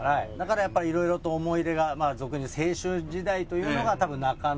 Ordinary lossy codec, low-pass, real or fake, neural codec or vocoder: none; none; real; none